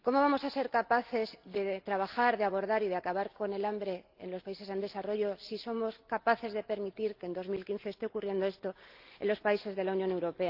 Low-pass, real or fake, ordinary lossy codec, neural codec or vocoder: 5.4 kHz; real; Opus, 32 kbps; none